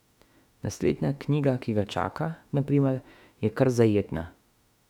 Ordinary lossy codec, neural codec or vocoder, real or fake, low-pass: none; autoencoder, 48 kHz, 32 numbers a frame, DAC-VAE, trained on Japanese speech; fake; 19.8 kHz